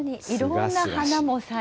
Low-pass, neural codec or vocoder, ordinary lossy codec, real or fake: none; none; none; real